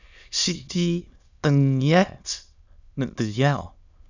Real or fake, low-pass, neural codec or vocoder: fake; 7.2 kHz; autoencoder, 22.05 kHz, a latent of 192 numbers a frame, VITS, trained on many speakers